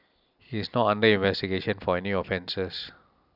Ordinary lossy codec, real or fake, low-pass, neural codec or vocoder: none; real; 5.4 kHz; none